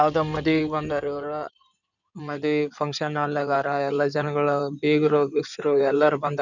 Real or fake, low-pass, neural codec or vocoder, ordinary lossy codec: fake; 7.2 kHz; codec, 16 kHz in and 24 kHz out, 2.2 kbps, FireRedTTS-2 codec; none